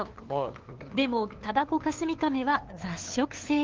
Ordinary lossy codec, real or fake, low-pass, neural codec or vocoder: Opus, 16 kbps; fake; 7.2 kHz; codec, 16 kHz, 2 kbps, FunCodec, trained on LibriTTS, 25 frames a second